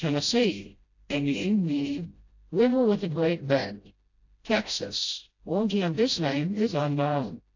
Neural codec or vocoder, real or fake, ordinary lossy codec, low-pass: codec, 16 kHz, 0.5 kbps, FreqCodec, smaller model; fake; AAC, 48 kbps; 7.2 kHz